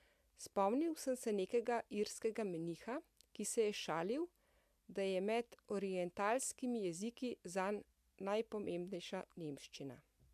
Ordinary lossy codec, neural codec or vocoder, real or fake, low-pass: AAC, 96 kbps; none; real; 14.4 kHz